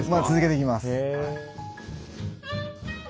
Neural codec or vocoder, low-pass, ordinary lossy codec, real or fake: none; none; none; real